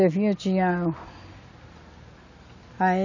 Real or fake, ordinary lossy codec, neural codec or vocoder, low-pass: real; none; none; 7.2 kHz